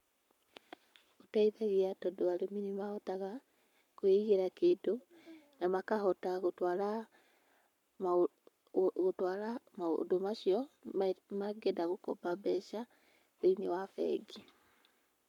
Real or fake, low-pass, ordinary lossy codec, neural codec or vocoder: fake; 19.8 kHz; none; codec, 44.1 kHz, 7.8 kbps, Pupu-Codec